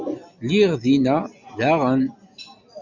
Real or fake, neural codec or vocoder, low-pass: real; none; 7.2 kHz